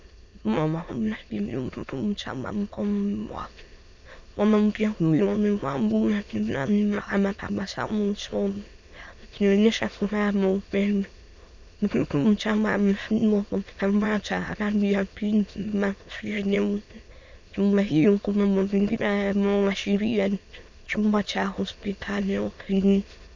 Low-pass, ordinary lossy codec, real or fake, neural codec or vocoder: 7.2 kHz; MP3, 64 kbps; fake; autoencoder, 22.05 kHz, a latent of 192 numbers a frame, VITS, trained on many speakers